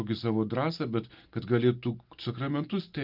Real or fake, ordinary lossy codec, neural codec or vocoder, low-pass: real; Opus, 24 kbps; none; 5.4 kHz